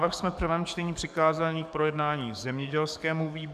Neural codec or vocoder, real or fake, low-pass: codec, 44.1 kHz, 7.8 kbps, DAC; fake; 14.4 kHz